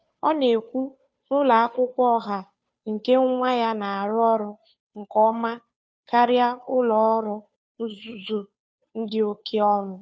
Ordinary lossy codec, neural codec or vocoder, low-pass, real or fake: none; codec, 16 kHz, 2 kbps, FunCodec, trained on Chinese and English, 25 frames a second; none; fake